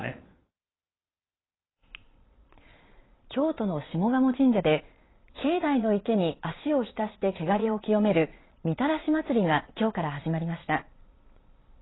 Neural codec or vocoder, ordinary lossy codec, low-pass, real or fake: vocoder, 22.05 kHz, 80 mel bands, Vocos; AAC, 16 kbps; 7.2 kHz; fake